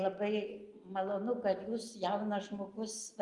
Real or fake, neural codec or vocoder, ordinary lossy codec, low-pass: real; none; Opus, 32 kbps; 10.8 kHz